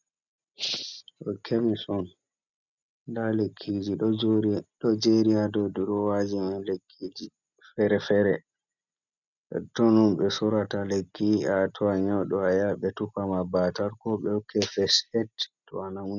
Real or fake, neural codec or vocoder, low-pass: real; none; 7.2 kHz